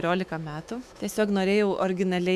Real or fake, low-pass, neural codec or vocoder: fake; 14.4 kHz; autoencoder, 48 kHz, 128 numbers a frame, DAC-VAE, trained on Japanese speech